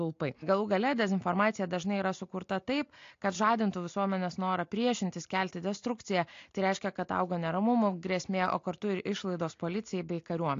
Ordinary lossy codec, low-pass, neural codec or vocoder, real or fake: AAC, 48 kbps; 7.2 kHz; none; real